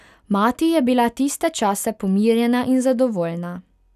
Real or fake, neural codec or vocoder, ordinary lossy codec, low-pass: real; none; none; 14.4 kHz